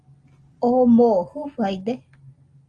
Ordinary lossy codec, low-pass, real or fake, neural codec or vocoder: Opus, 32 kbps; 9.9 kHz; real; none